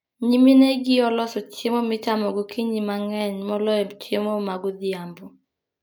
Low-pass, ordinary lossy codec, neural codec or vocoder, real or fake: none; none; none; real